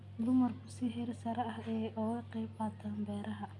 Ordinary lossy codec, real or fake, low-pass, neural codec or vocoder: none; real; none; none